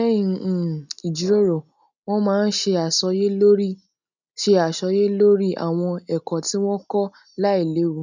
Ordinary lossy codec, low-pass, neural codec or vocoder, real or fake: none; 7.2 kHz; none; real